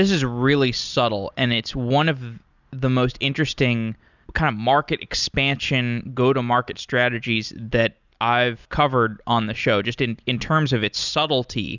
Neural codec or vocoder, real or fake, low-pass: none; real; 7.2 kHz